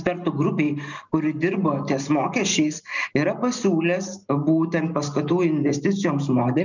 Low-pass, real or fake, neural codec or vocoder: 7.2 kHz; real; none